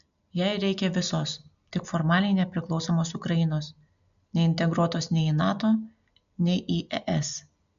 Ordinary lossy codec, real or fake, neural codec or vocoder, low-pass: MP3, 96 kbps; real; none; 7.2 kHz